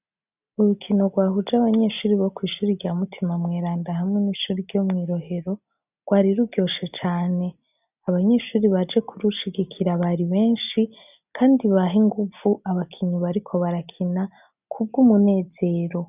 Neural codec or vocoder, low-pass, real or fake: none; 3.6 kHz; real